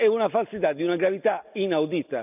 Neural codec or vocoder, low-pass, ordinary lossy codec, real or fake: none; 3.6 kHz; none; real